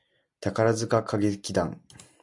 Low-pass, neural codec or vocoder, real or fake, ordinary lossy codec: 10.8 kHz; none; real; MP3, 96 kbps